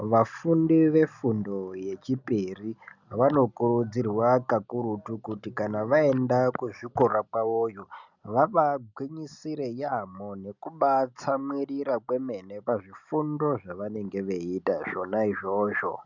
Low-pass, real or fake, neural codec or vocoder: 7.2 kHz; real; none